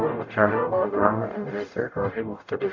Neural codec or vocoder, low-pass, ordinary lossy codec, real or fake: codec, 44.1 kHz, 0.9 kbps, DAC; 7.2 kHz; none; fake